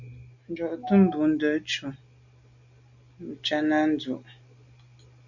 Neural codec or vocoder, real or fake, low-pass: none; real; 7.2 kHz